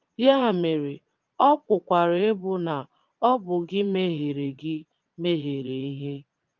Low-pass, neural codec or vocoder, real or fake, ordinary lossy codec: 7.2 kHz; vocoder, 22.05 kHz, 80 mel bands, Vocos; fake; Opus, 24 kbps